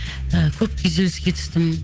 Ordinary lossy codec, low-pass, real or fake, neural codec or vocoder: none; none; fake; codec, 16 kHz, 8 kbps, FunCodec, trained on Chinese and English, 25 frames a second